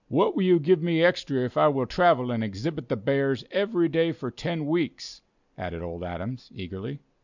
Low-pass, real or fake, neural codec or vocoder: 7.2 kHz; real; none